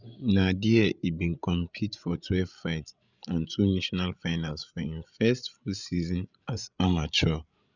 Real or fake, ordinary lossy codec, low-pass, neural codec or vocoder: fake; none; 7.2 kHz; codec, 16 kHz, 16 kbps, FreqCodec, larger model